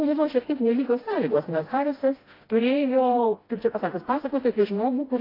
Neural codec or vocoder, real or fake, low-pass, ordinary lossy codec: codec, 16 kHz, 1 kbps, FreqCodec, smaller model; fake; 5.4 kHz; AAC, 24 kbps